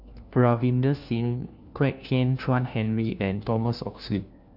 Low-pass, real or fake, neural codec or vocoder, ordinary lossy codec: 5.4 kHz; fake; codec, 16 kHz, 1 kbps, FunCodec, trained on LibriTTS, 50 frames a second; none